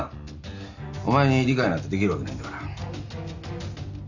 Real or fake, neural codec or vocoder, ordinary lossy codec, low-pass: real; none; none; 7.2 kHz